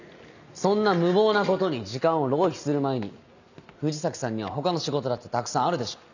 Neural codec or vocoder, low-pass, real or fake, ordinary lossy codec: none; 7.2 kHz; real; none